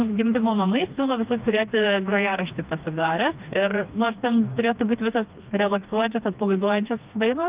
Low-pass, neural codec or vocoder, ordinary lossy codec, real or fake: 3.6 kHz; codec, 16 kHz, 2 kbps, FreqCodec, smaller model; Opus, 24 kbps; fake